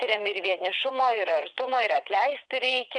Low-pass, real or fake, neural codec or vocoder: 9.9 kHz; fake; vocoder, 22.05 kHz, 80 mel bands, WaveNeXt